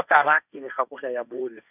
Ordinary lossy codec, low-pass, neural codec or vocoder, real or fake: none; 3.6 kHz; codec, 16 kHz, 1.1 kbps, Voila-Tokenizer; fake